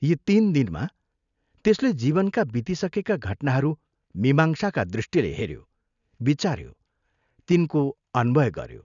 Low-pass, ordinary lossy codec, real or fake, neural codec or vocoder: 7.2 kHz; none; real; none